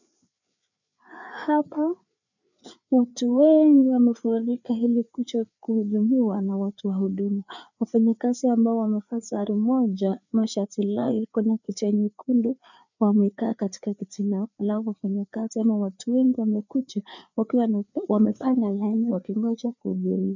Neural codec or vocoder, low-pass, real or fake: codec, 16 kHz, 4 kbps, FreqCodec, larger model; 7.2 kHz; fake